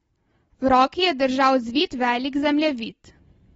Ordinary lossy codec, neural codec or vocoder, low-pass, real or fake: AAC, 24 kbps; none; 19.8 kHz; real